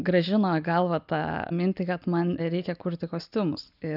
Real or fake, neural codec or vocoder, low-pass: real; none; 5.4 kHz